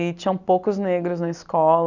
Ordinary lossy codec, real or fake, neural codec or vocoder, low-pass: none; real; none; 7.2 kHz